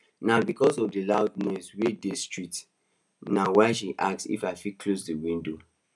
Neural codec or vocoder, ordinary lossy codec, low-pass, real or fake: none; none; none; real